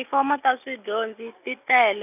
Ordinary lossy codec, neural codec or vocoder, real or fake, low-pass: none; none; real; 3.6 kHz